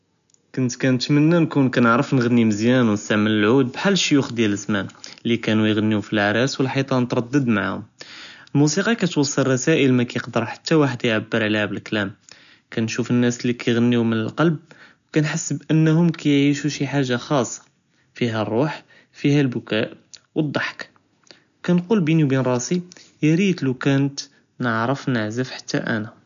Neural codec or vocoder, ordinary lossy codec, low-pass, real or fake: none; none; 7.2 kHz; real